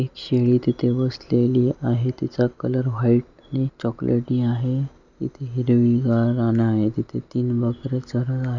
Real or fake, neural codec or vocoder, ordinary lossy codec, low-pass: real; none; none; 7.2 kHz